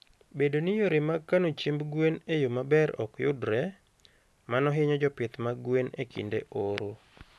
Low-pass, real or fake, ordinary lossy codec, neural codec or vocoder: none; real; none; none